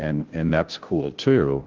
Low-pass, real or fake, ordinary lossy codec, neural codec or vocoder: 7.2 kHz; fake; Opus, 16 kbps; codec, 16 kHz, 0.5 kbps, FunCodec, trained on Chinese and English, 25 frames a second